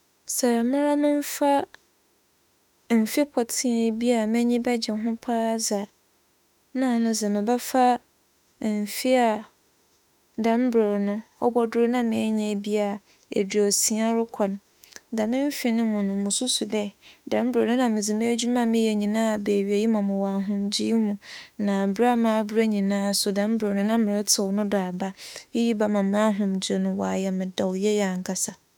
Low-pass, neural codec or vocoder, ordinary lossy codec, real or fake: 19.8 kHz; autoencoder, 48 kHz, 32 numbers a frame, DAC-VAE, trained on Japanese speech; none; fake